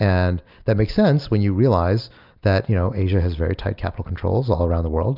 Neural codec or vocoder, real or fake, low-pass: none; real; 5.4 kHz